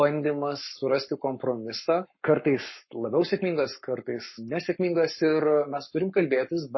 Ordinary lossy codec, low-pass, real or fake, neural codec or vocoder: MP3, 24 kbps; 7.2 kHz; fake; codec, 44.1 kHz, 7.8 kbps, Pupu-Codec